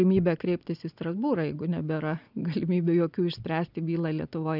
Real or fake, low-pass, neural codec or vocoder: real; 5.4 kHz; none